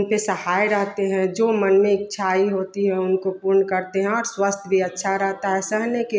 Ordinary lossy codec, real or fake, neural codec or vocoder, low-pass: none; real; none; none